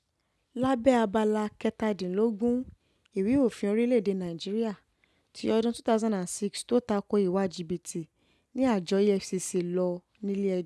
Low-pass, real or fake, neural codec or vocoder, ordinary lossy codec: none; real; none; none